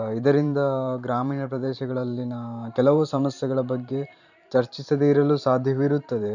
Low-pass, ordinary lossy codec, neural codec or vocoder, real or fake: 7.2 kHz; none; vocoder, 44.1 kHz, 128 mel bands every 512 samples, BigVGAN v2; fake